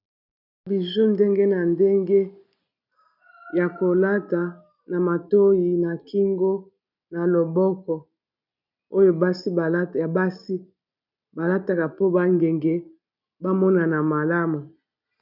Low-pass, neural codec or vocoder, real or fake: 5.4 kHz; none; real